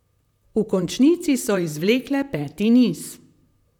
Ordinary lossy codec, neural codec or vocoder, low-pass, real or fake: none; vocoder, 44.1 kHz, 128 mel bands, Pupu-Vocoder; 19.8 kHz; fake